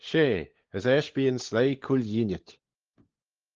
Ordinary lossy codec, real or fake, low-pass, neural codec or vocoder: Opus, 16 kbps; fake; 7.2 kHz; codec, 16 kHz, 16 kbps, FunCodec, trained on LibriTTS, 50 frames a second